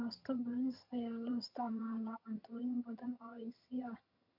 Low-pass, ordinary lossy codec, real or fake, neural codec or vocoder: 5.4 kHz; none; fake; vocoder, 22.05 kHz, 80 mel bands, HiFi-GAN